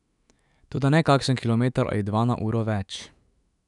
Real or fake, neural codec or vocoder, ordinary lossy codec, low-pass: fake; autoencoder, 48 kHz, 128 numbers a frame, DAC-VAE, trained on Japanese speech; none; 10.8 kHz